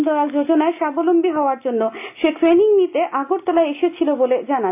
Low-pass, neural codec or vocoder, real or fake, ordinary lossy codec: 3.6 kHz; none; real; AAC, 32 kbps